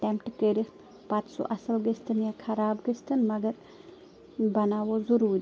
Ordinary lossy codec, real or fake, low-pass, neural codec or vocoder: none; real; none; none